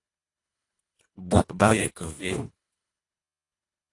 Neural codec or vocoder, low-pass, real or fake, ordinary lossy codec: codec, 24 kHz, 1.5 kbps, HILCodec; 10.8 kHz; fake; AAC, 48 kbps